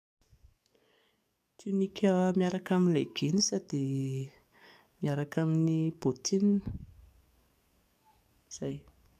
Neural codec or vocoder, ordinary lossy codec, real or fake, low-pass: codec, 44.1 kHz, 7.8 kbps, DAC; AAC, 96 kbps; fake; 14.4 kHz